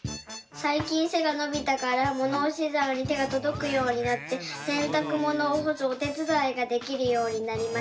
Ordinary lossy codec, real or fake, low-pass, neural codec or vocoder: none; real; none; none